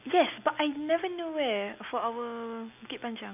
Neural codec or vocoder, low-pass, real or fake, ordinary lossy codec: none; 3.6 kHz; real; none